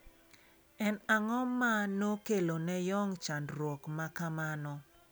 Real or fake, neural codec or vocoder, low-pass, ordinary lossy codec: real; none; none; none